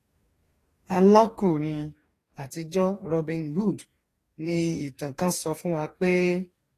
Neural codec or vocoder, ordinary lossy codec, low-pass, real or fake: codec, 44.1 kHz, 2.6 kbps, DAC; AAC, 48 kbps; 14.4 kHz; fake